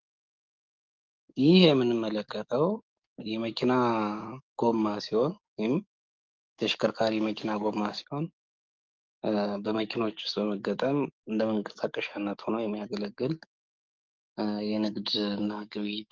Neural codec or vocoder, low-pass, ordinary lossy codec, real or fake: codec, 44.1 kHz, 7.8 kbps, DAC; 7.2 kHz; Opus, 24 kbps; fake